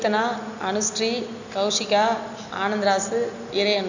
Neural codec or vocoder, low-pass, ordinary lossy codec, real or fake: none; 7.2 kHz; none; real